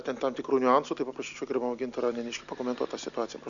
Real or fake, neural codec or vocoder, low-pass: real; none; 7.2 kHz